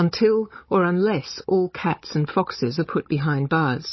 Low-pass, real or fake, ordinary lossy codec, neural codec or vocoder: 7.2 kHz; fake; MP3, 24 kbps; codec, 16 kHz, 16 kbps, FunCodec, trained on Chinese and English, 50 frames a second